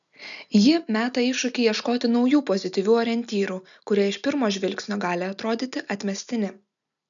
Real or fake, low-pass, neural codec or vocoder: real; 7.2 kHz; none